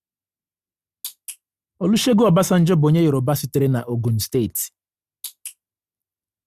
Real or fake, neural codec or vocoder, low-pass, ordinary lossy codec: real; none; 14.4 kHz; Opus, 64 kbps